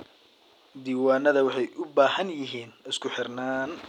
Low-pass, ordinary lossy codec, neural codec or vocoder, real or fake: 19.8 kHz; none; none; real